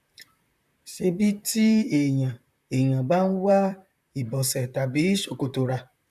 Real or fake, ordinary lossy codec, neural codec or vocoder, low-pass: fake; none; vocoder, 44.1 kHz, 128 mel bands, Pupu-Vocoder; 14.4 kHz